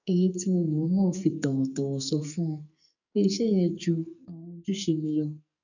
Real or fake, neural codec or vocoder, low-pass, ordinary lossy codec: fake; codec, 44.1 kHz, 2.6 kbps, SNAC; 7.2 kHz; none